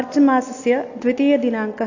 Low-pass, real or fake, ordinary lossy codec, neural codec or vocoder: 7.2 kHz; real; AAC, 48 kbps; none